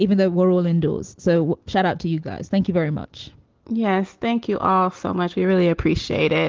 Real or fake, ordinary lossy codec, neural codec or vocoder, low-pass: real; Opus, 16 kbps; none; 7.2 kHz